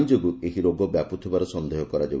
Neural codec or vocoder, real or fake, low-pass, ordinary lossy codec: none; real; none; none